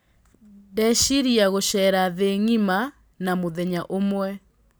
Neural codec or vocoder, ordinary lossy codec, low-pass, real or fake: none; none; none; real